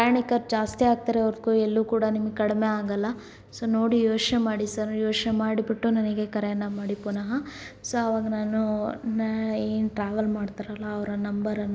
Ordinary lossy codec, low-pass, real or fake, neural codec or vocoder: none; none; real; none